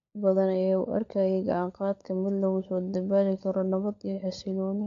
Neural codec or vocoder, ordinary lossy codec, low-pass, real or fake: codec, 16 kHz, 16 kbps, FunCodec, trained on LibriTTS, 50 frames a second; AAC, 64 kbps; 7.2 kHz; fake